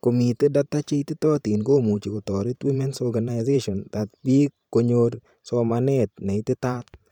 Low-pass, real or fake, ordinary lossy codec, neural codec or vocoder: 19.8 kHz; fake; none; vocoder, 44.1 kHz, 128 mel bands every 512 samples, BigVGAN v2